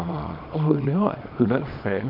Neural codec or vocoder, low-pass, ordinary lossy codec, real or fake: codec, 24 kHz, 0.9 kbps, WavTokenizer, small release; 5.4 kHz; none; fake